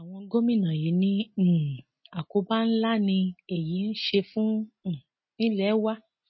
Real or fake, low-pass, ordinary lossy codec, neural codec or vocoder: real; 7.2 kHz; MP3, 24 kbps; none